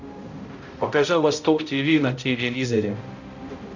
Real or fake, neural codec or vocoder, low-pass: fake; codec, 16 kHz, 0.5 kbps, X-Codec, HuBERT features, trained on balanced general audio; 7.2 kHz